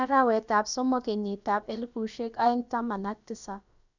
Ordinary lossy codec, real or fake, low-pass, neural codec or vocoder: none; fake; 7.2 kHz; codec, 16 kHz, about 1 kbps, DyCAST, with the encoder's durations